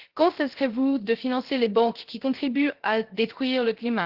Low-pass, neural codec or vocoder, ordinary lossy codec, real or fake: 5.4 kHz; codec, 16 kHz, 0.3 kbps, FocalCodec; Opus, 16 kbps; fake